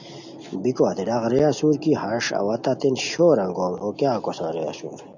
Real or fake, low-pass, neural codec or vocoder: real; 7.2 kHz; none